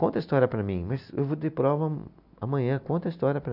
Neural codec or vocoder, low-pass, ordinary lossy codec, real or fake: none; 5.4 kHz; none; real